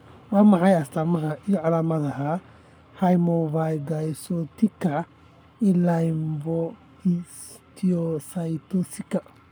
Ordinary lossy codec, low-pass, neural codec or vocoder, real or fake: none; none; codec, 44.1 kHz, 7.8 kbps, Pupu-Codec; fake